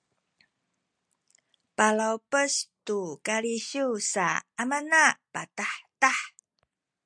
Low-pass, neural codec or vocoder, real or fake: 9.9 kHz; none; real